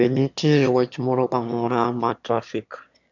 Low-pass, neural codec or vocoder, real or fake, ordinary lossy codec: 7.2 kHz; autoencoder, 22.05 kHz, a latent of 192 numbers a frame, VITS, trained on one speaker; fake; none